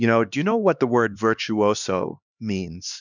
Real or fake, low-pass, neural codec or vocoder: fake; 7.2 kHz; codec, 16 kHz, 2 kbps, X-Codec, HuBERT features, trained on LibriSpeech